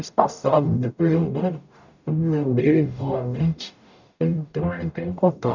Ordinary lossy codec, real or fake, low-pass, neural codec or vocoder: none; fake; 7.2 kHz; codec, 44.1 kHz, 0.9 kbps, DAC